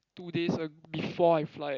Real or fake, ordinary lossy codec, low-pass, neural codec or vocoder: real; Opus, 64 kbps; 7.2 kHz; none